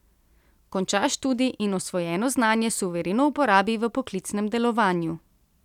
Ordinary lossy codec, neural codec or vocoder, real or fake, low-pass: none; none; real; 19.8 kHz